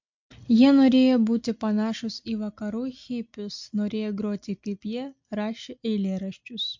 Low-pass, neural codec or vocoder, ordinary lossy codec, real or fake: 7.2 kHz; none; MP3, 48 kbps; real